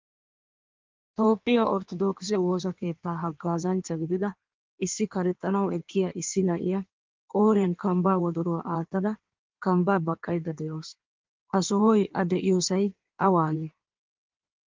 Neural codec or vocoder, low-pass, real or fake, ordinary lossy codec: codec, 16 kHz in and 24 kHz out, 1.1 kbps, FireRedTTS-2 codec; 7.2 kHz; fake; Opus, 32 kbps